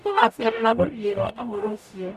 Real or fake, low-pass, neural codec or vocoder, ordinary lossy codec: fake; 14.4 kHz; codec, 44.1 kHz, 0.9 kbps, DAC; none